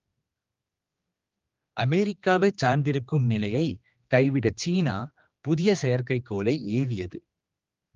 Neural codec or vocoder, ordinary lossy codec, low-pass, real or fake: codec, 16 kHz, 2 kbps, X-Codec, HuBERT features, trained on general audio; Opus, 32 kbps; 7.2 kHz; fake